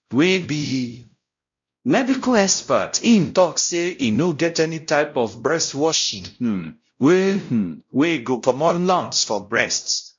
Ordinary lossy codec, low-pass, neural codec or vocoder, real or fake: MP3, 48 kbps; 7.2 kHz; codec, 16 kHz, 0.5 kbps, X-Codec, WavLM features, trained on Multilingual LibriSpeech; fake